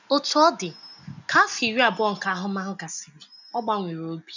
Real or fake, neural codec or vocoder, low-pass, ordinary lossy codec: real; none; 7.2 kHz; none